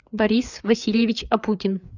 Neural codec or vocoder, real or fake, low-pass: codec, 16 kHz, 4 kbps, FreqCodec, larger model; fake; 7.2 kHz